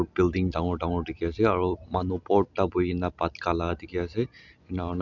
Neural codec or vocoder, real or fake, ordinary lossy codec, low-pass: none; real; none; 7.2 kHz